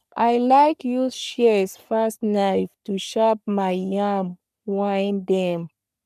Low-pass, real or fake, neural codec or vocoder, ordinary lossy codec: 14.4 kHz; fake; codec, 44.1 kHz, 3.4 kbps, Pupu-Codec; none